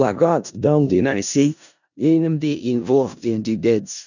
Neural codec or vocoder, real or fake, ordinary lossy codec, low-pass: codec, 16 kHz in and 24 kHz out, 0.4 kbps, LongCat-Audio-Codec, four codebook decoder; fake; none; 7.2 kHz